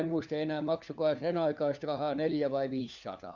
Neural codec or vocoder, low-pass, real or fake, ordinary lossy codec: codec, 16 kHz, 4 kbps, FunCodec, trained on LibriTTS, 50 frames a second; 7.2 kHz; fake; none